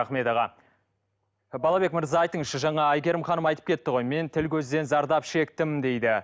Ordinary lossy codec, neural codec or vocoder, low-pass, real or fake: none; none; none; real